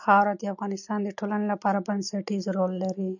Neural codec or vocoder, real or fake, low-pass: none; real; 7.2 kHz